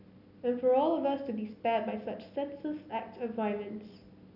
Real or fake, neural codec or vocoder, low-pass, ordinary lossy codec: real; none; 5.4 kHz; none